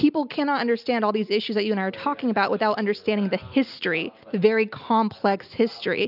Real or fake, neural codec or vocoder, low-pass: real; none; 5.4 kHz